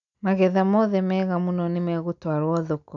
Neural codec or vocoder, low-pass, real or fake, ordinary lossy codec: none; 7.2 kHz; real; none